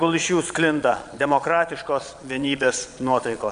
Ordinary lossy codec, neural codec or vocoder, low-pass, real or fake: MP3, 64 kbps; vocoder, 22.05 kHz, 80 mel bands, Vocos; 9.9 kHz; fake